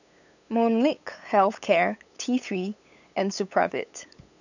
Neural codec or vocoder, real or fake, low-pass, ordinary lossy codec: codec, 16 kHz, 8 kbps, FunCodec, trained on LibriTTS, 25 frames a second; fake; 7.2 kHz; none